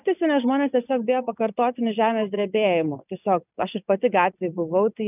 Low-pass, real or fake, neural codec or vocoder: 3.6 kHz; real; none